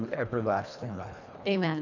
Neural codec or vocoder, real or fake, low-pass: codec, 24 kHz, 1.5 kbps, HILCodec; fake; 7.2 kHz